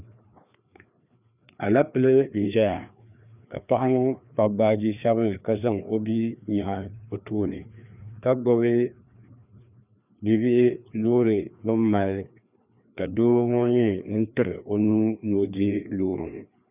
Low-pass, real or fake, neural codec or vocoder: 3.6 kHz; fake; codec, 16 kHz, 2 kbps, FreqCodec, larger model